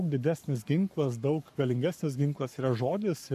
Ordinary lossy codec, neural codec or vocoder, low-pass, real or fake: AAC, 96 kbps; codec, 44.1 kHz, 7.8 kbps, Pupu-Codec; 14.4 kHz; fake